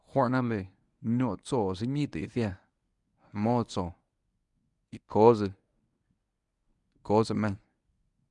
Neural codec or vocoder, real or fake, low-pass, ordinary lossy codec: codec, 24 kHz, 0.9 kbps, WavTokenizer, medium speech release version 1; fake; 10.8 kHz; none